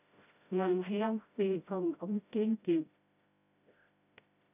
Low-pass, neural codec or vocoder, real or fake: 3.6 kHz; codec, 16 kHz, 0.5 kbps, FreqCodec, smaller model; fake